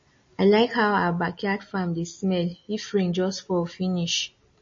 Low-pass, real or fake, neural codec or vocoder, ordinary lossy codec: 7.2 kHz; real; none; MP3, 32 kbps